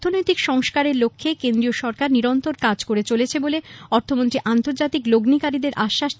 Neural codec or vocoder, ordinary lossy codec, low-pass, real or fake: none; none; none; real